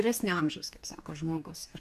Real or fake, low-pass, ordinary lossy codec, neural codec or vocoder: fake; 14.4 kHz; MP3, 96 kbps; codec, 44.1 kHz, 2.6 kbps, DAC